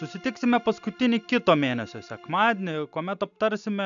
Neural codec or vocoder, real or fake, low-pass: none; real; 7.2 kHz